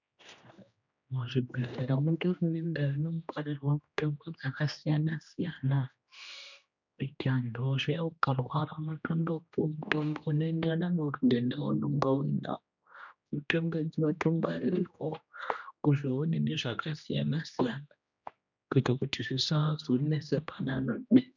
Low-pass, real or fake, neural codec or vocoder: 7.2 kHz; fake; codec, 16 kHz, 1 kbps, X-Codec, HuBERT features, trained on general audio